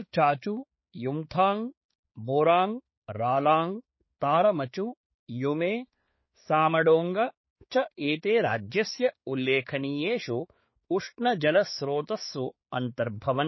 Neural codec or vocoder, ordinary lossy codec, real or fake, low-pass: codec, 16 kHz, 4 kbps, X-Codec, HuBERT features, trained on balanced general audio; MP3, 24 kbps; fake; 7.2 kHz